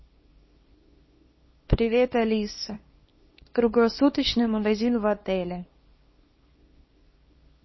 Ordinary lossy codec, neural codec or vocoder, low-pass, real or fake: MP3, 24 kbps; codec, 24 kHz, 0.9 kbps, WavTokenizer, small release; 7.2 kHz; fake